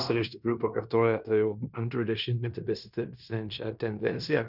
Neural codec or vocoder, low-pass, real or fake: codec, 16 kHz in and 24 kHz out, 0.9 kbps, LongCat-Audio-Codec, fine tuned four codebook decoder; 5.4 kHz; fake